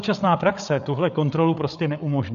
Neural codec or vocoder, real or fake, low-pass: codec, 16 kHz, 8 kbps, FreqCodec, larger model; fake; 7.2 kHz